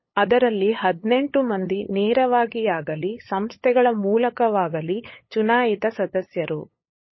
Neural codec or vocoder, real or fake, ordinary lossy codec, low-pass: codec, 16 kHz, 8 kbps, FunCodec, trained on LibriTTS, 25 frames a second; fake; MP3, 24 kbps; 7.2 kHz